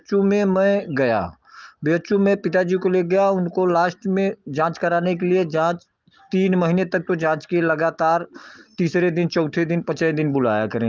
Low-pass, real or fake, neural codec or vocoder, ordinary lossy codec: 7.2 kHz; real; none; Opus, 32 kbps